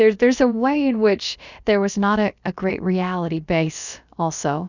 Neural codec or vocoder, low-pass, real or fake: codec, 16 kHz, about 1 kbps, DyCAST, with the encoder's durations; 7.2 kHz; fake